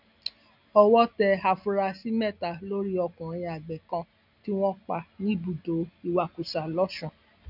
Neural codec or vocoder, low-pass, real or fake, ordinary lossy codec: none; 5.4 kHz; real; none